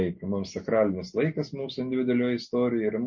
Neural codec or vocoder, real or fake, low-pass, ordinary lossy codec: none; real; 7.2 kHz; MP3, 32 kbps